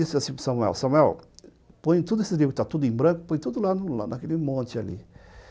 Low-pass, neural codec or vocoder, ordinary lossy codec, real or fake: none; none; none; real